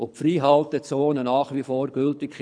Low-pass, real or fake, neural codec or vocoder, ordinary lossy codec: 9.9 kHz; fake; codec, 24 kHz, 6 kbps, HILCodec; none